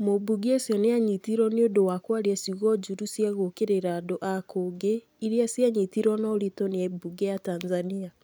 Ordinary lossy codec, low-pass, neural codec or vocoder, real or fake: none; none; none; real